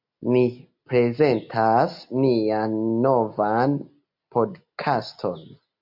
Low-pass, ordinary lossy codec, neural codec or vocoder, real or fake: 5.4 kHz; MP3, 48 kbps; none; real